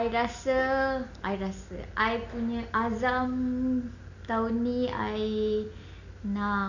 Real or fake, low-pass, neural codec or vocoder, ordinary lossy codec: real; 7.2 kHz; none; none